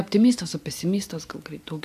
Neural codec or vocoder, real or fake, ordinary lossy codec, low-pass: none; real; AAC, 96 kbps; 14.4 kHz